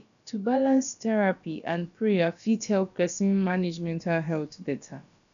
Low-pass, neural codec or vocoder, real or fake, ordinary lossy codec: 7.2 kHz; codec, 16 kHz, about 1 kbps, DyCAST, with the encoder's durations; fake; none